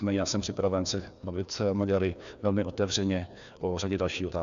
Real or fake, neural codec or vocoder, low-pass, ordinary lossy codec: fake; codec, 16 kHz, 2 kbps, FreqCodec, larger model; 7.2 kHz; MP3, 96 kbps